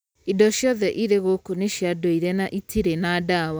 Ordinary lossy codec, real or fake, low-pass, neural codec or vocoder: none; real; none; none